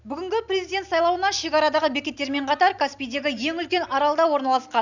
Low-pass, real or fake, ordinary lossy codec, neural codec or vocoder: 7.2 kHz; real; none; none